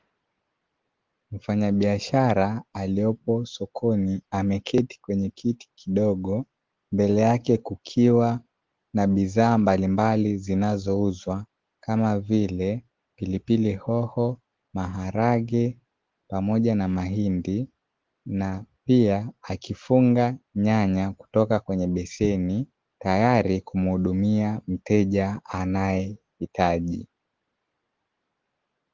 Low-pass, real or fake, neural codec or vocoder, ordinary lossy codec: 7.2 kHz; real; none; Opus, 16 kbps